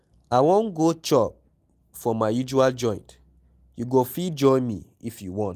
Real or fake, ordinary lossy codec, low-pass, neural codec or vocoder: real; Opus, 32 kbps; 14.4 kHz; none